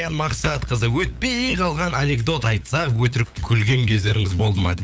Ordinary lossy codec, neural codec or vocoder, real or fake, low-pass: none; codec, 16 kHz, 8 kbps, FunCodec, trained on LibriTTS, 25 frames a second; fake; none